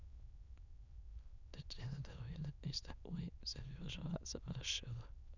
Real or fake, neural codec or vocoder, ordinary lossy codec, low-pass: fake; autoencoder, 22.05 kHz, a latent of 192 numbers a frame, VITS, trained on many speakers; none; 7.2 kHz